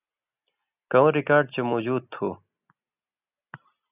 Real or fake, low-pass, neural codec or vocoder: real; 3.6 kHz; none